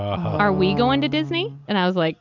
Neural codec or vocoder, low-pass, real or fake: none; 7.2 kHz; real